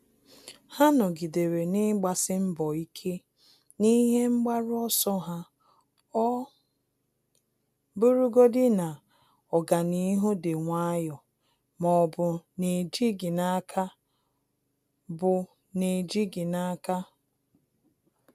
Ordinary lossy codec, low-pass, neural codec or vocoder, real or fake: none; 14.4 kHz; none; real